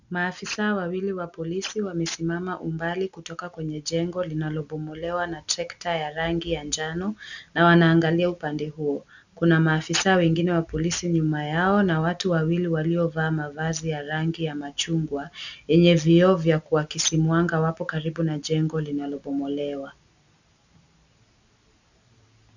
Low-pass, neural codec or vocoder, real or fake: 7.2 kHz; none; real